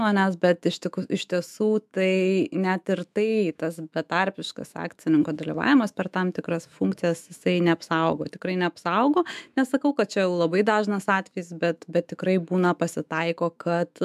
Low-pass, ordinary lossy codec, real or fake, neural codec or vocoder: 14.4 kHz; MP3, 96 kbps; fake; autoencoder, 48 kHz, 128 numbers a frame, DAC-VAE, trained on Japanese speech